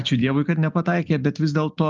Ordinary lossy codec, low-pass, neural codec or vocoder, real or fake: Opus, 32 kbps; 7.2 kHz; none; real